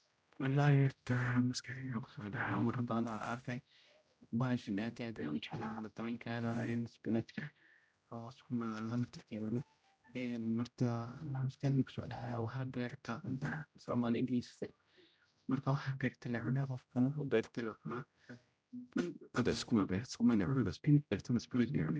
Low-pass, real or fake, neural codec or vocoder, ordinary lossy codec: none; fake; codec, 16 kHz, 0.5 kbps, X-Codec, HuBERT features, trained on general audio; none